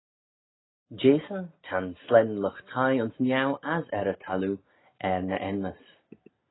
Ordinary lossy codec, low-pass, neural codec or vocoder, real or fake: AAC, 16 kbps; 7.2 kHz; none; real